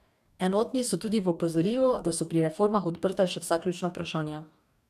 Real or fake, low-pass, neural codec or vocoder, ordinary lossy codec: fake; 14.4 kHz; codec, 44.1 kHz, 2.6 kbps, DAC; none